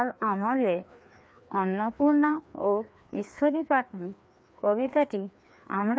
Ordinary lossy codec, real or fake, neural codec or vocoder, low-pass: none; fake; codec, 16 kHz, 2 kbps, FreqCodec, larger model; none